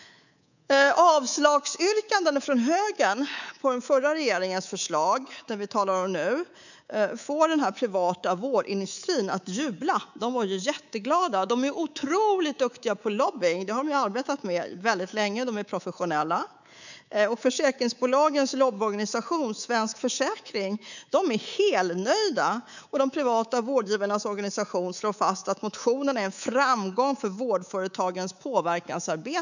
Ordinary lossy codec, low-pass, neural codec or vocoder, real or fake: none; 7.2 kHz; codec, 24 kHz, 3.1 kbps, DualCodec; fake